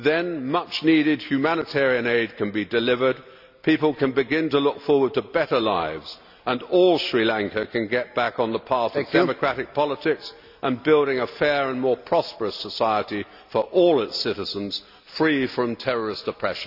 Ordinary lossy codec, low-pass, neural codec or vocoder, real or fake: none; 5.4 kHz; none; real